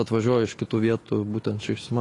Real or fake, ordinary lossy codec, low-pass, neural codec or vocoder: fake; AAC, 32 kbps; 10.8 kHz; autoencoder, 48 kHz, 128 numbers a frame, DAC-VAE, trained on Japanese speech